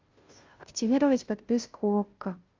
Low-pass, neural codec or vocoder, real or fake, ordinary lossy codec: 7.2 kHz; codec, 16 kHz, 0.5 kbps, FunCodec, trained on Chinese and English, 25 frames a second; fake; Opus, 32 kbps